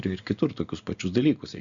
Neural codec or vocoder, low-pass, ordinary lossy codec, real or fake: none; 7.2 kHz; Opus, 64 kbps; real